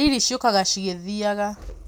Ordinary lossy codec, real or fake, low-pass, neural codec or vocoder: none; real; none; none